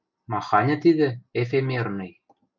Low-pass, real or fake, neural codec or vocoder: 7.2 kHz; real; none